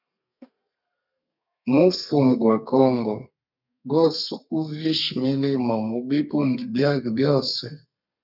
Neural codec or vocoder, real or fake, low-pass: codec, 32 kHz, 1.9 kbps, SNAC; fake; 5.4 kHz